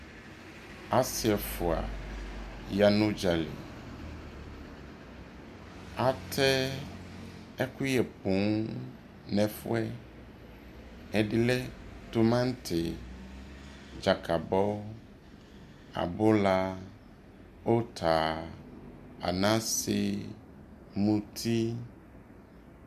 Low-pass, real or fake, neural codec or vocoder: 14.4 kHz; real; none